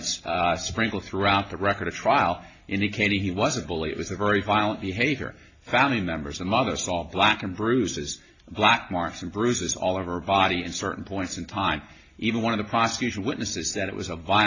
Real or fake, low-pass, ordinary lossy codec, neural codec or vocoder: real; 7.2 kHz; AAC, 32 kbps; none